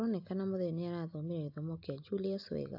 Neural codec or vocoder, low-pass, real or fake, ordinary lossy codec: none; 5.4 kHz; real; AAC, 48 kbps